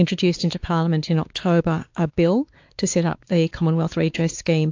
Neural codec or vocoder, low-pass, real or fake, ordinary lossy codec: codec, 16 kHz, 4 kbps, X-Codec, WavLM features, trained on Multilingual LibriSpeech; 7.2 kHz; fake; AAC, 48 kbps